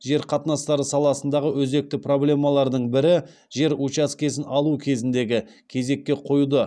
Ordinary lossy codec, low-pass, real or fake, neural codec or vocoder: none; none; real; none